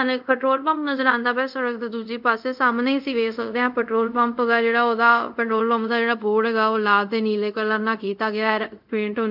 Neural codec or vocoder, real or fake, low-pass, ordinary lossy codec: codec, 24 kHz, 0.5 kbps, DualCodec; fake; 5.4 kHz; none